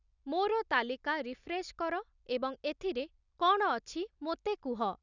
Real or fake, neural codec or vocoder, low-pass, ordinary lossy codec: real; none; 7.2 kHz; none